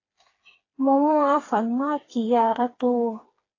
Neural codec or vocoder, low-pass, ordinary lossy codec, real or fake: codec, 16 kHz, 4 kbps, FreqCodec, smaller model; 7.2 kHz; AAC, 32 kbps; fake